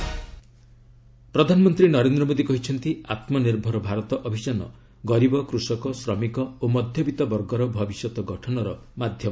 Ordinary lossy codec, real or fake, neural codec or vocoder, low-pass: none; real; none; none